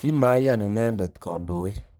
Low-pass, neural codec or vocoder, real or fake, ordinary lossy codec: none; codec, 44.1 kHz, 1.7 kbps, Pupu-Codec; fake; none